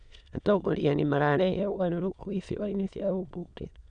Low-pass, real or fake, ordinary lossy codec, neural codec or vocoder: 9.9 kHz; fake; none; autoencoder, 22.05 kHz, a latent of 192 numbers a frame, VITS, trained on many speakers